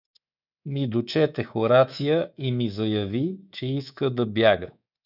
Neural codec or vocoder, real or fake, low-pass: codec, 24 kHz, 3.1 kbps, DualCodec; fake; 5.4 kHz